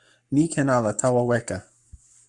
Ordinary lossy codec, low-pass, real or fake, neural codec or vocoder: Opus, 64 kbps; 10.8 kHz; fake; codec, 44.1 kHz, 7.8 kbps, Pupu-Codec